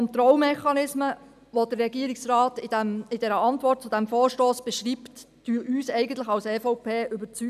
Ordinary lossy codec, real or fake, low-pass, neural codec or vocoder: none; real; 14.4 kHz; none